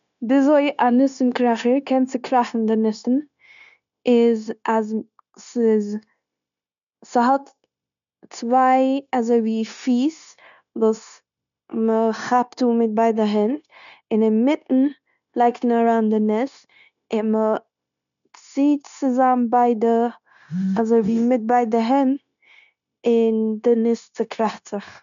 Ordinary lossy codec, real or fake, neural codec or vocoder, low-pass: none; fake; codec, 16 kHz, 0.9 kbps, LongCat-Audio-Codec; 7.2 kHz